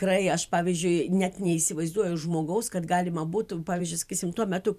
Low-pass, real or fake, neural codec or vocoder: 14.4 kHz; fake; vocoder, 44.1 kHz, 128 mel bands every 512 samples, BigVGAN v2